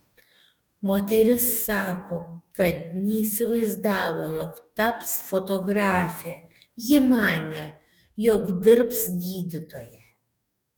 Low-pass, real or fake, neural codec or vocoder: 19.8 kHz; fake; codec, 44.1 kHz, 2.6 kbps, DAC